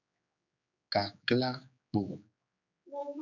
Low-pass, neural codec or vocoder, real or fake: 7.2 kHz; codec, 16 kHz, 4 kbps, X-Codec, HuBERT features, trained on general audio; fake